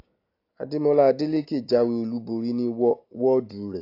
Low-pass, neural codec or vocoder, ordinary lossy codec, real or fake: 5.4 kHz; none; AAC, 32 kbps; real